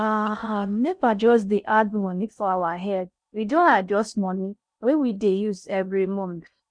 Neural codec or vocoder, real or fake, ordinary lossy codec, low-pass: codec, 16 kHz in and 24 kHz out, 0.6 kbps, FocalCodec, streaming, 4096 codes; fake; none; 9.9 kHz